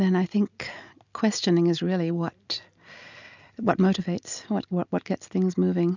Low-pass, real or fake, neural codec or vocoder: 7.2 kHz; real; none